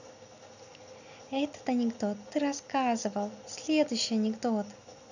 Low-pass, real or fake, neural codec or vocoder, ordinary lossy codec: 7.2 kHz; real; none; none